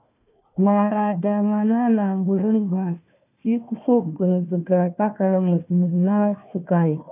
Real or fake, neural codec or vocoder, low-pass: fake; codec, 16 kHz, 1 kbps, FunCodec, trained on Chinese and English, 50 frames a second; 3.6 kHz